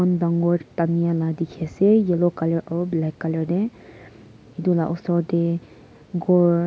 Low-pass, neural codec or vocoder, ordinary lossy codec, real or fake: none; none; none; real